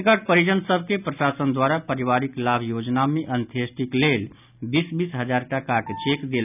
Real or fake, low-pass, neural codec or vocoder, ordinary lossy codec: real; 3.6 kHz; none; none